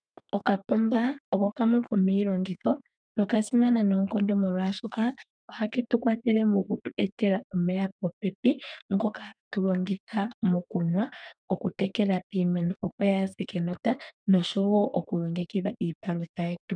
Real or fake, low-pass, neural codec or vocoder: fake; 9.9 kHz; codec, 32 kHz, 1.9 kbps, SNAC